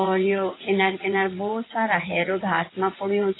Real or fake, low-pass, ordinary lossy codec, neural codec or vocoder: fake; 7.2 kHz; AAC, 16 kbps; vocoder, 22.05 kHz, 80 mel bands, WaveNeXt